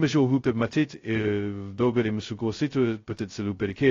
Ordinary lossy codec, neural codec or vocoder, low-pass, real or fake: AAC, 32 kbps; codec, 16 kHz, 0.2 kbps, FocalCodec; 7.2 kHz; fake